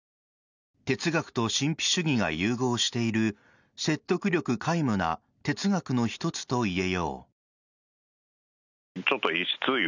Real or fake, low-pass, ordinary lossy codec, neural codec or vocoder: real; 7.2 kHz; none; none